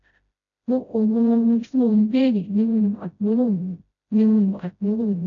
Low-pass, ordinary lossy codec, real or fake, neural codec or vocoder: 7.2 kHz; none; fake; codec, 16 kHz, 0.5 kbps, FreqCodec, smaller model